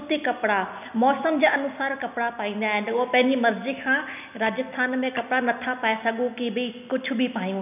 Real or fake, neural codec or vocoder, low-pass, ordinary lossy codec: real; none; 3.6 kHz; none